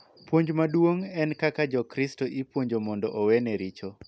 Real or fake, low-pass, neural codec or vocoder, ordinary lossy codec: real; none; none; none